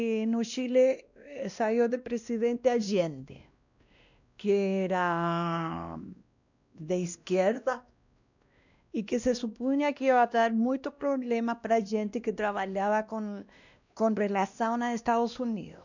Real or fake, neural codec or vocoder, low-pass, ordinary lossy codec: fake; codec, 16 kHz, 1 kbps, X-Codec, WavLM features, trained on Multilingual LibriSpeech; 7.2 kHz; none